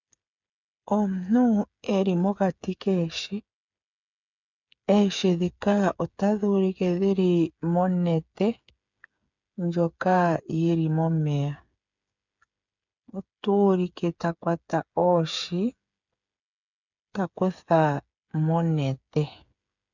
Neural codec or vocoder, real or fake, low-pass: codec, 16 kHz, 8 kbps, FreqCodec, smaller model; fake; 7.2 kHz